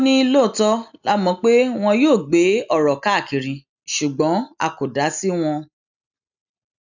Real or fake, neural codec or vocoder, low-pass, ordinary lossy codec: real; none; 7.2 kHz; none